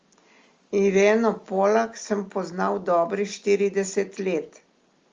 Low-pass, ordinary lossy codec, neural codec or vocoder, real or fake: 7.2 kHz; Opus, 32 kbps; none; real